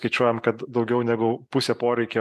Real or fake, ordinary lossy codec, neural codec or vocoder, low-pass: real; MP3, 96 kbps; none; 14.4 kHz